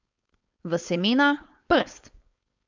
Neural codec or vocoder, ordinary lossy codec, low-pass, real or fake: codec, 16 kHz, 4.8 kbps, FACodec; MP3, 64 kbps; 7.2 kHz; fake